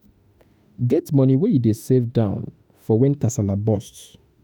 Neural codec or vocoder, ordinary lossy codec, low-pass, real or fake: autoencoder, 48 kHz, 32 numbers a frame, DAC-VAE, trained on Japanese speech; none; none; fake